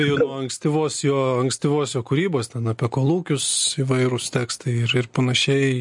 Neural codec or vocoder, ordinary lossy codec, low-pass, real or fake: none; MP3, 48 kbps; 10.8 kHz; real